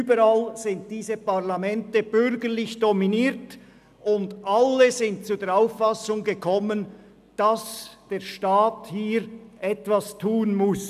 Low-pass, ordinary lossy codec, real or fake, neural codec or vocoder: 14.4 kHz; none; real; none